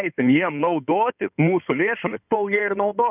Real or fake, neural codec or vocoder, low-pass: fake; codec, 16 kHz in and 24 kHz out, 2.2 kbps, FireRedTTS-2 codec; 3.6 kHz